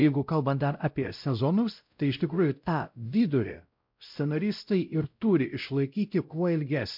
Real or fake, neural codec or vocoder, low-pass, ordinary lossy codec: fake; codec, 16 kHz, 0.5 kbps, X-Codec, WavLM features, trained on Multilingual LibriSpeech; 5.4 kHz; MP3, 48 kbps